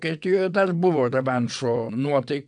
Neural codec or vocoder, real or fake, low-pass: vocoder, 22.05 kHz, 80 mel bands, WaveNeXt; fake; 9.9 kHz